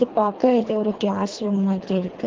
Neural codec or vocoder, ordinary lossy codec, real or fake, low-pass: codec, 24 kHz, 3 kbps, HILCodec; Opus, 16 kbps; fake; 7.2 kHz